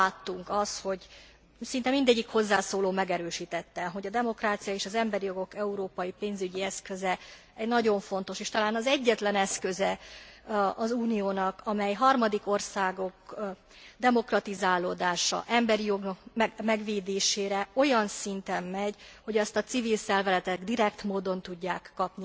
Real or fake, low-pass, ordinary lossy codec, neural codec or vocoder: real; none; none; none